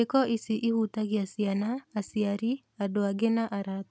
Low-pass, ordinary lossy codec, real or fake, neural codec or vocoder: none; none; real; none